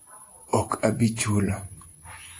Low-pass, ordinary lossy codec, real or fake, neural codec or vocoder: 10.8 kHz; AAC, 48 kbps; fake; vocoder, 44.1 kHz, 128 mel bands every 512 samples, BigVGAN v2